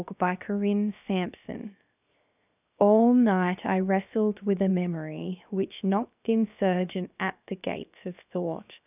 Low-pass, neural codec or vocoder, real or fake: 3.6 kHz; codec, 16 kHz, 0.7 kbps, FocalCodec; fake